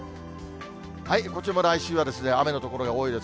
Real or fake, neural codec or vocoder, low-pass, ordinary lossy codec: real; none; none; none